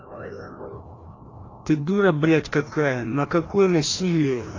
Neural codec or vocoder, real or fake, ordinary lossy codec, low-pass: codec, 16 kHz, 1 kbps, FreqCodec, larger model; fake; AAC, 32 kbps; 7.2 kHz